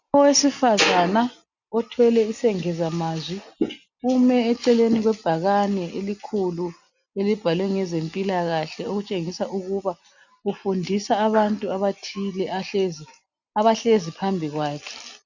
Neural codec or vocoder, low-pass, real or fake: none; 7.2 kHz; real